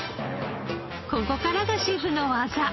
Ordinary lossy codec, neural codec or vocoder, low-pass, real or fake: MP3, 24 kbps; none; 7.2 kHz; real